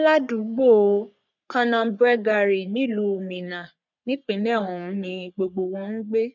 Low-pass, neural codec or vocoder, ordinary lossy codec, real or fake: 7.2 kHz; codec, 44.1 kHz, 3.4 kbps, Pupu-Codec; none; fake